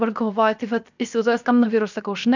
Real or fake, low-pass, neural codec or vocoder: fake; 7.2 kHz; codec, 16 kHz, 0.7 kbps, FocalCodec